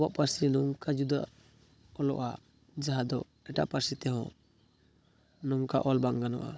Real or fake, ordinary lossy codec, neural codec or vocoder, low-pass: fake; none; codec, 16 kHz, 16 kbps, FunCodec, trained on Chinese and English, 50 frames a second; none